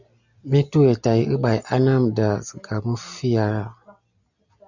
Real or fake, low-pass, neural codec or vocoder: real; 7.2 kHz; none